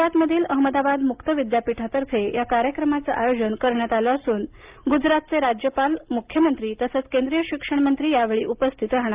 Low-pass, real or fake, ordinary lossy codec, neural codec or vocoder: 3.6 kHz; real; Opus, 32 kbps; none